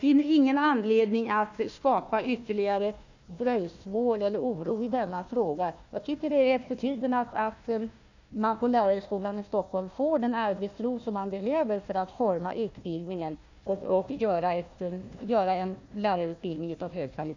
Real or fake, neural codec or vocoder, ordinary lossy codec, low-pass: fake; codec, 16 kHz, 1 kbps, FunCodec, trained on Chinese and English, 50 frames a second; none; 7.2 kHz